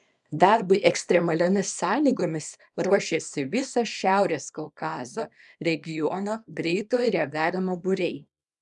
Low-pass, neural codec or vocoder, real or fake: 10.8 kHz; codec, 24 kHz, 0.9 kbps, WavTokenizer, small release; fake